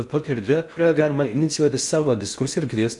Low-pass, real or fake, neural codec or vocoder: 10.8 kHz; fake; codec, 16 kHz in and 24 kHz out, 0.6 kbps, FocalCodec, streaming, 4096 codes